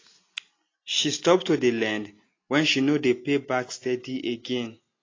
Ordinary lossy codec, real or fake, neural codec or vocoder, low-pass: AAC, 32 kbps; real; none; 7.2 kHz